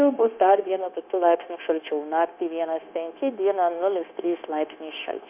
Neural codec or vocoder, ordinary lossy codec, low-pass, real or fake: codec, 16 kHz, 0.9 kbps, LongCat-Audio-Codec; MP3, 32 kbps; 3.6 kHz; fake